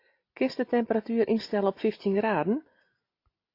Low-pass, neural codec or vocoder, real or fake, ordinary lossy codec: 5.4 kHz; none; real; AAC, 32 kbps